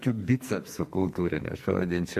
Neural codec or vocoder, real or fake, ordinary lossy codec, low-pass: codec, 44.1 kHz, 2.6 kbps, SNAC; fake; MP3, 64 kbps; 14.4 kHz